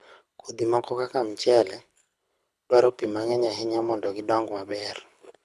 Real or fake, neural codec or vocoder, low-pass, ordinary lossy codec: fake; codec, 24 kHz, 6 kbps, HILCodec; none; none